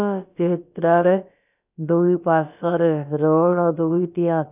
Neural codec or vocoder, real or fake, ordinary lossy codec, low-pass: codec, 16 kHz, about 1 kbps, DyCAST, with the encoder's durations; fake; none; 3.6 kHz